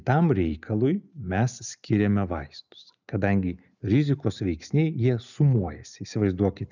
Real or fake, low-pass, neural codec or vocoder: real; 7.2 kHz; none